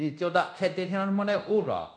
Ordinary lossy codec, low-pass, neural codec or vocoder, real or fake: none; 9.9 kHz; codec, 24 kHz, 0.9 kbps, DualCodec; fake